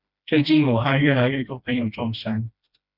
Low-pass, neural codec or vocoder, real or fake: 5.4 kHz; codec, 16 kHz, 1 kbps, FreqCodec, smaller model; fake